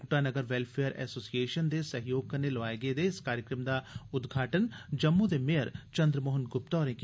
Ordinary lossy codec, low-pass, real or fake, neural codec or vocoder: none; none; real; none